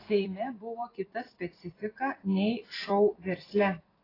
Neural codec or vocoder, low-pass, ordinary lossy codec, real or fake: vocoder, 44.1 kHz, 128 mel bands every 256 samples, BigVGAN v2; 5.4 kHz; AAC, 24 kbps; fake